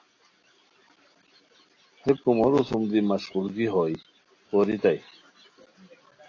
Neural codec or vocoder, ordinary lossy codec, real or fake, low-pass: none; AAC, 32 kbps; real; 7.2 kHz